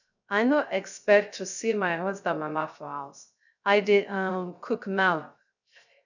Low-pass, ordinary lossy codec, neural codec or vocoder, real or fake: 7.2 kHz; none; codec, 16 kHz, 0.3 kbps, FocalCodec; fake